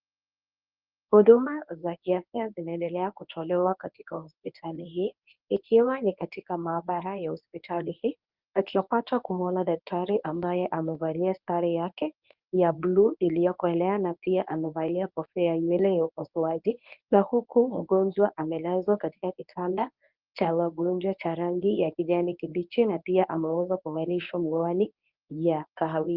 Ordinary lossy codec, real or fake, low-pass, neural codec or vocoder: Opus, 24 kbps; fake; 5.4 kHz; codec, 24 kHz, 0.9 kbps, WavTokenizer, medium speech release version 1